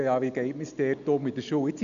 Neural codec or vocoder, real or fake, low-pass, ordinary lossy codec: none; real; 7.2 kHz; none